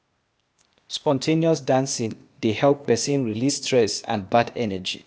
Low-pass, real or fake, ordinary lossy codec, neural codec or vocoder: none; fake; none; codec, 16 kHz, 0.8 kbps, ZipCodec